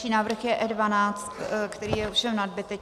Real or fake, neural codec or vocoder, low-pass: real; none; 14.4 kHz